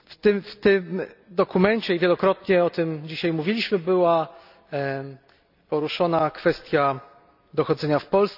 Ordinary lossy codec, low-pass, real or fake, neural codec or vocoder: none; 5.4 kHz; real; none